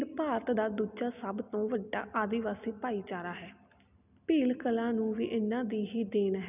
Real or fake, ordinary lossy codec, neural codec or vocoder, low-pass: real; none; none; 3.6 kHz